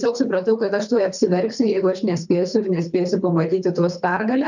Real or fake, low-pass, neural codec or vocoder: fake; 7.2 kHz; codec, 24 kHz, 3 kbps, HILCodec